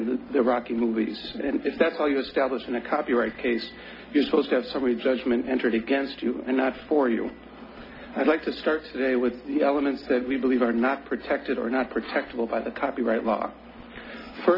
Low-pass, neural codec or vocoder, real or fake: 5.4 kHz; none; real